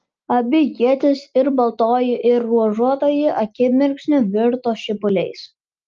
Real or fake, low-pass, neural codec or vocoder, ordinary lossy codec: real; 7.2 kHz; none; Opus, 24 kbps